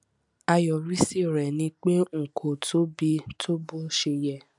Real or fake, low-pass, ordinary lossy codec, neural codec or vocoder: real; 10.8 kHz; none; none